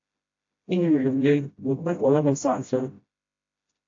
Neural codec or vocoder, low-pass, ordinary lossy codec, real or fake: codec, 16 kHz, 0.5 kbps, FreqCodec, smaller model; 7.2 kHz; AAC, 48 kbps; fake